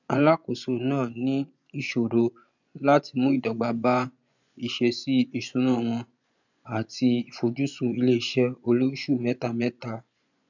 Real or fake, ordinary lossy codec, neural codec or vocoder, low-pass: fake; none; vocoder, 24 kHz, 100 mel bands, Vocos; 7.2 kHz